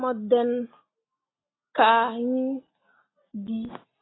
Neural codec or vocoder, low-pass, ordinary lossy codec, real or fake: none; 7.2 kHz; AAC, 16 kbps; real